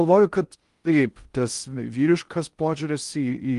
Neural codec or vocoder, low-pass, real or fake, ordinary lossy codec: codec, 16 kHz in and 24 kHz out, 0.6 kbps, FocalCodec, streaming, 4096 codes; 10.8 kHz; fake; Opus, 32 kbps